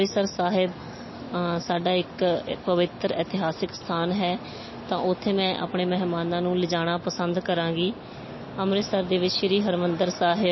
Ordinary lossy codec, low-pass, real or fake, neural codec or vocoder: MP3, 24 kbps; 7.2 kHz; real; none